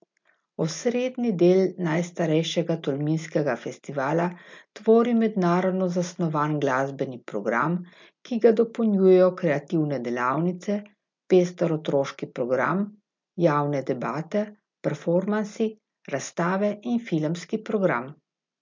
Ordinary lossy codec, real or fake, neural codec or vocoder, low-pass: MP3, 64 kbps; real; none; 7.2 kHz